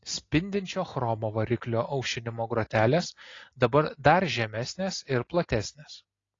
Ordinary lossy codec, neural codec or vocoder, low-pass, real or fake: AAC, 32 kbps; none; 7.2 kHz; real